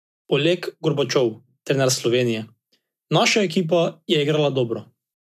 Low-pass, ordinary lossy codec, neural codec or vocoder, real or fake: 14.4 kHz; none; none; real